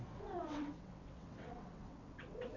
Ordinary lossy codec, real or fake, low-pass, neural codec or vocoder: none; fake; 7.2 kHz; vocoder, 22.05 kHz, 80 mel bands, WaveNeXt